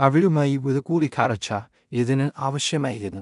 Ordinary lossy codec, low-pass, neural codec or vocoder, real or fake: none; 10.8 kHz; codec, 16 kHz in and 24 kHz out, 0.4 kbps, LongCat-Audio-Codec, two codebook decoder; fake